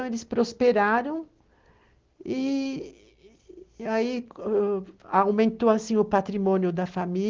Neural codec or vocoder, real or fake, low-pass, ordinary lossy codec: none; real; 7.2 kHz; Opus, 16 kbps